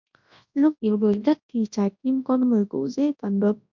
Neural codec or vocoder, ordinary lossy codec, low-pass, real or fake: codec, 24 kHz, 0.9 kbps, WavTokenizer, large speech release; MP3, 48 kbps; 7.2 kHz; fake